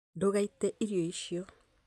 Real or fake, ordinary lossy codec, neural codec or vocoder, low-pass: real; none; none; none